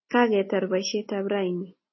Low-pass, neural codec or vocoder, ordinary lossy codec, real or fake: 7.2 kHz; none; MP3, 24 kbps; real